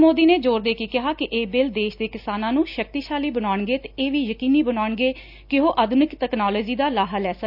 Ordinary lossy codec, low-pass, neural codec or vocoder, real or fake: none; 5.4 kHz; none; real